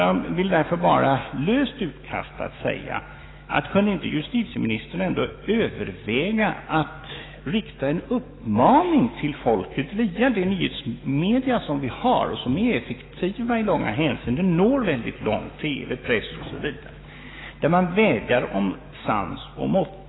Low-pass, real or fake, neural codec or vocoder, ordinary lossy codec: 7.2 kHz; fake; autoencoder, 48 kHz, 128 numbers a frame, DAC-VAE, trained on Japanese speech; AAC, 16 kbps